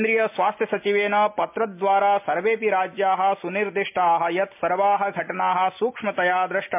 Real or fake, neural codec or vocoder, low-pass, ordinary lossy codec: real; none; 3.6 kHz; MP3, 32 kbps